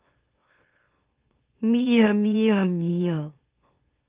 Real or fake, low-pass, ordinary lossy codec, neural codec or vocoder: fake; 3.6 kHz; Opus, 32 kbps; autoencoder, 44.1 kHz, a latent of 192 numbers a frame, MeloTTS